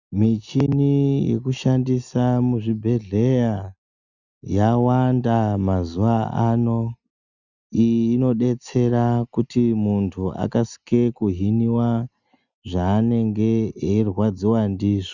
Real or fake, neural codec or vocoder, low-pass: real; none; 7.2 kHz